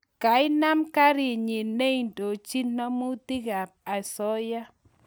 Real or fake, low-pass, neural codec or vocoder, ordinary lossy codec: real; none; none; none